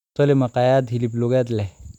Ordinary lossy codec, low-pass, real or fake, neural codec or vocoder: none; 19.8 kHz; real; none